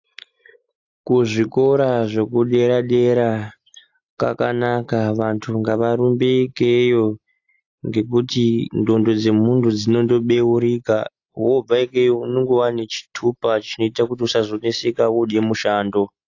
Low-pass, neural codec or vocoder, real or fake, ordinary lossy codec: 7.2 kHz; none; real; AAC, 48 kbps